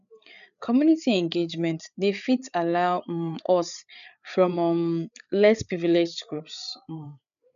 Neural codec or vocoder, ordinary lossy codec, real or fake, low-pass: codec, 16 kHz, 8 kbps, FreqCodec, larger model; none; fake; 7.2 kHz